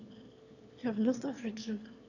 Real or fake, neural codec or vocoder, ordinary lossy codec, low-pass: fake; autoencoder, 22.05 kHz, a latent of 192 numbers a frame, VITS, trained on one speaker; none; 7.2 kHz